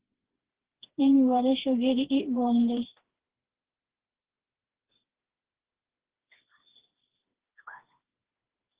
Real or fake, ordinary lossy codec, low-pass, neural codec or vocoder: fake; Opus, 16 kbps; 3.6 kHz; codec, 16 kHz, 2 kbps, FreqCodec, smaller model